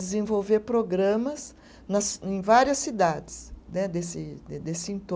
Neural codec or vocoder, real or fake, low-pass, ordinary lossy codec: none; real; none; none